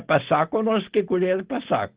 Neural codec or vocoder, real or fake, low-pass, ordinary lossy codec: none; real; 3.6 kHz; Opus, 16 kbps